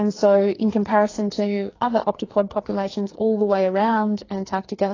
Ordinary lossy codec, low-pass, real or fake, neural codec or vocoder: AAC, 32 kbps; 7.2 kHz; fake; codec, 44.1 kHz, 2.6 kbps, SNAC